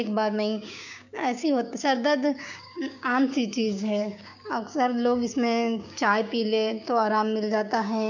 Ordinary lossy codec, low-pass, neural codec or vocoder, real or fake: none; 7.2 kHz; codec, 16 kHz, 6 kbps, DAC; fake